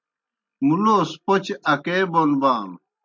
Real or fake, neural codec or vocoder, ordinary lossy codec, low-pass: real; none; MP3, 64 kbps; 7.2 kHz